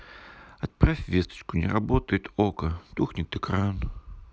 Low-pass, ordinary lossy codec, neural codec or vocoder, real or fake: none; none; none; real